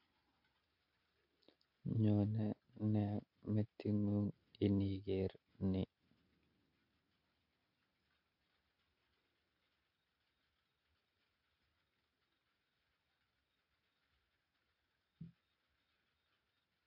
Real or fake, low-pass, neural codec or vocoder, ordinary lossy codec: real; 5.4 kHz; none; MP3, 32 kbps